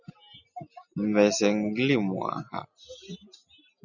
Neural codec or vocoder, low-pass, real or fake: none; 7.2 kHz; real